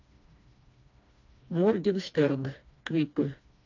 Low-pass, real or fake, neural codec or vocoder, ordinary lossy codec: 7.2 kHz; fake; codec, 16 kHz, 2 kbps, FreqCodec, smaller model; AAC, 48 kbps